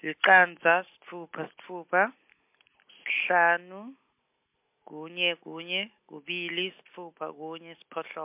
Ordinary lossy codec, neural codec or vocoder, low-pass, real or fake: MP3, 32 kbps; none; 3.6 kHz; real